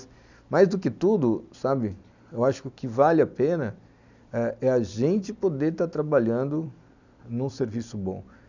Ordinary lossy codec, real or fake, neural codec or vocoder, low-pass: none; real; none; 7.2 kHz